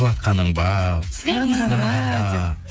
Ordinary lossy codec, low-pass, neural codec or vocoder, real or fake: none; none; codec, 16 kHz, 8 kbps, FreqCodec, smaller model; fake